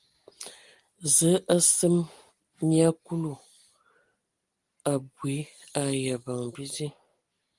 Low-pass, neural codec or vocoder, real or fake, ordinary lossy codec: 10.8 kHz; none; real; Opus, 24 kbps